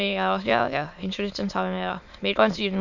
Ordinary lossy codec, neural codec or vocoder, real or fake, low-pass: none; autoencoder, 22.05 kHz, a latent of 192 numbers a frame, VITS, trained on many speakers; fake; 7.2 kHz